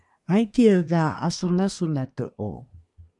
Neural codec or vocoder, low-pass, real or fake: codec, 24 kHz, 1 kbps, SNAC; 10.8 kHz; fake